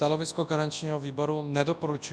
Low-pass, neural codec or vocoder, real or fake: 9.9 kHz; codec, 24 kHz, 0.9 kbps, WavTokenizer, large speech release; fake